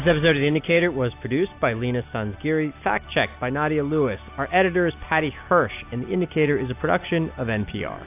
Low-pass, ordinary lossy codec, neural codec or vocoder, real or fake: 3.6 kHz; AAC, 32 kbps; none; real